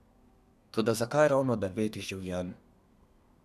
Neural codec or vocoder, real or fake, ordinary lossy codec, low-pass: codec, 32 kHz, 1.9 kbps, SNAC; fake; none; 14.4 kHz